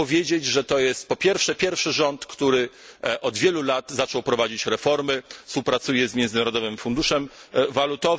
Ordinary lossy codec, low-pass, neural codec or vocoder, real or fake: none; none; none; real